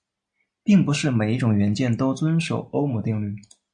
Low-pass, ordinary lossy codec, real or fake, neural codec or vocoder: 9.9 kHz; MP3, 64 kbps; real; none